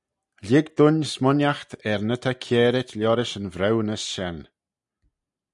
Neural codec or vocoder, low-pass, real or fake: none; 10.8 kHz; real